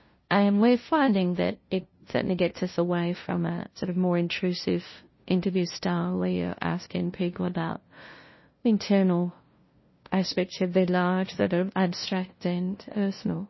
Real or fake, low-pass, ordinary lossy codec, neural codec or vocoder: fake; 7.2 kHz; MP3, 24 kbps; codec, 16 kHz, 0.5 kbps, FunCodec, trained on LibriTTS, 25 frames a second